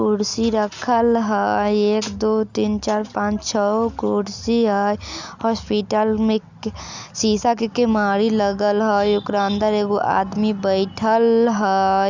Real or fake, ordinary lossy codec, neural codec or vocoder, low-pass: real; Opus, 64 kbps; none; 7.2 kHz